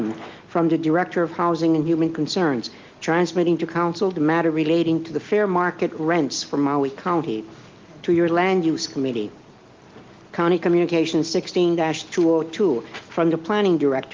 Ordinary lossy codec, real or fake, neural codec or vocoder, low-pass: Opus, 32 kbps; real; none; 7.2 kHz